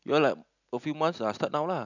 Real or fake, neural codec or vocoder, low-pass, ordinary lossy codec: real; none; 7.2 kHz; none